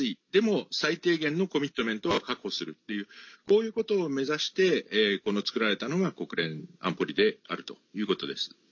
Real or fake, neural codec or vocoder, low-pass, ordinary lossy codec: real; none; 7.2 kHz; MP3, 48 kbps